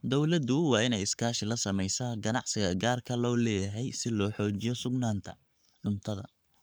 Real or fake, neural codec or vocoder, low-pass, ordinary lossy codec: fake; codec, 44.1 kHz, 7.8 kbps, Pupu-Codec; none; none